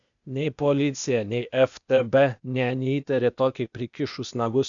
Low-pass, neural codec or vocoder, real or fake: 7.2 kHz; codec, 16 kHz, 0.8 kbps, ZipCodec; fake